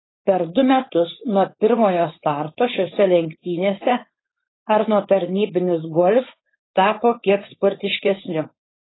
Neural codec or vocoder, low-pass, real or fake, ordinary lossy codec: codec, 16 kHz, 4.8 kbps, FACodec; 7.2 kHz; fake; AAC, 16 kbps